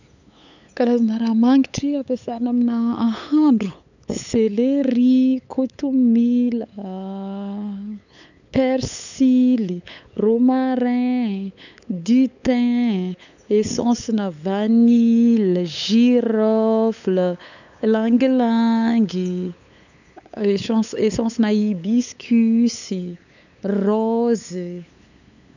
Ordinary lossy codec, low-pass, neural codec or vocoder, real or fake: none; 7.2 kHz; codec, 16 kHz, 8 kbps, FunCodec, trained on LibriTTS, 25 frames a second; fake